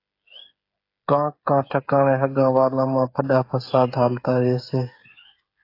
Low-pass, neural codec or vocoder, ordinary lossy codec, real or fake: 5.4 kHz; codec, 16 kHz, 8 kbps, FreqCodec, smaller model; AAC, 32 kbps; fake